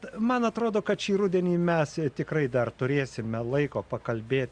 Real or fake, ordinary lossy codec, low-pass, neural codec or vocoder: real; Opus, 64 kbps; 9.9 kHz; none